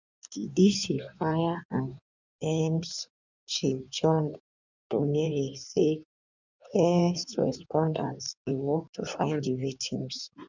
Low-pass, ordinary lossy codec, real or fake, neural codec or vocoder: 7.2 kHz; none; fake; codec, 16 kHz in and 24 kHz out, 1.1 kbps, FireRedTTS-2 codec